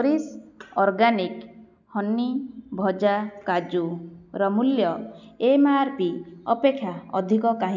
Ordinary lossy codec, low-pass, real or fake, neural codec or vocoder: none; 7.2 kHz; real; none